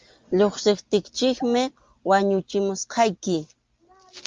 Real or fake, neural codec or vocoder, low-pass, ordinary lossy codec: real; none; 7.2 kHz; Opus, 32 kbps